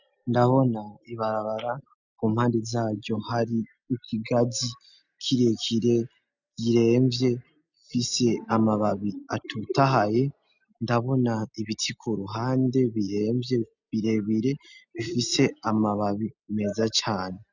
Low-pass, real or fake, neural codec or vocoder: 7.2 kHz; real; none